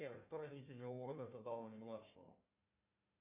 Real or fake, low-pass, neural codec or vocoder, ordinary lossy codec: fake; 3.6 kHz; codec, 16 kHz, 1 kbps, FunCodec, trained on Chinese and English, 50 frames a second; MP3, 32 kbps